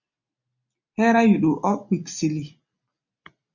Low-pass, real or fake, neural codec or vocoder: 7.2 kHz; real; none